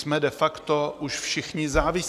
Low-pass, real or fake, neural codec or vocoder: 14.4 kHz; real; none